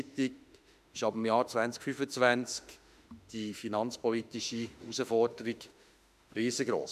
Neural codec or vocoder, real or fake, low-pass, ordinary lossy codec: autoencoder, 48 kHz, 32 numbers a frame, DAC-VAE, trained on Japanese speech; fake; 14.4 kHz; none